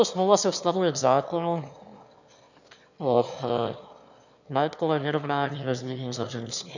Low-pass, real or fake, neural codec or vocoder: 7.2 kHz; fake; autoencoder, 22.05 kHz, a latent of 192 numbers a frame, VITS, trained on one speaker